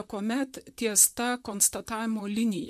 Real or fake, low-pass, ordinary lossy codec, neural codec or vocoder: fake; 14.4 kHz; MP3, 96 kbps; vocoder, 44.1 kHz, 128 mel bands, Pupu-Vocoder